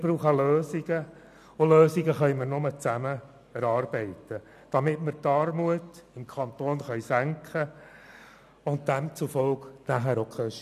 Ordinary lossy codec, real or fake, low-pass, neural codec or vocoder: none; real; 14.4 kHz; none